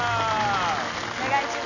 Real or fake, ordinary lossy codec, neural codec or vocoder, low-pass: real; none; none; 7.2 kHz